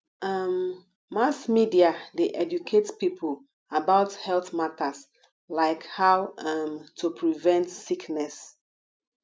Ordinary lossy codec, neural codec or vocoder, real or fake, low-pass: none; none; real; none